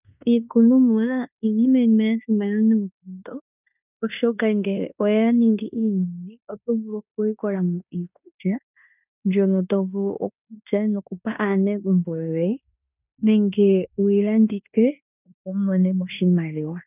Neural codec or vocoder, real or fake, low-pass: codec, 16 kHz in and 24 kHz out, 0.9 kbps, LongCat-Audio-Codec, fine tuned four codebook decoder; fake; 3.6 kHz